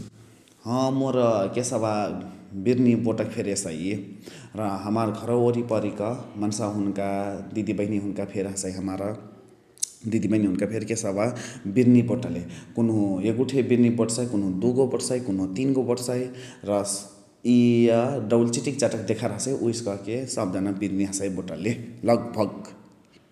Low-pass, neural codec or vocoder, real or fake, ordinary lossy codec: 14.4 kHz; none; real; none